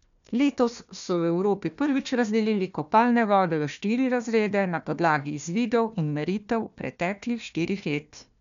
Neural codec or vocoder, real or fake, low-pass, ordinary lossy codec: codec, 16 kHz, 1 kbps, FunCodec, trained on Chinese and English, 50 frames a second; fake; 7.2 kHz; none